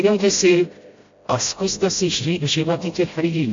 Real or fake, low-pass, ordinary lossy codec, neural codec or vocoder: fake; 7.2 kHz; AAC, 48 kbps; codec, 16 kHz, 0.5 kbps, FreqCodec, smaller model